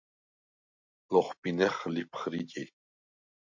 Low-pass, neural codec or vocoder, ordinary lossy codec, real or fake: 7.2 kHz; none; MP3, 48 kbps; real